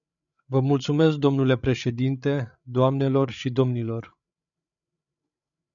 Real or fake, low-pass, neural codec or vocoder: fake; 7.2 kHz; codec, 16 kHz, 8 kbps, FreqCodec, larger model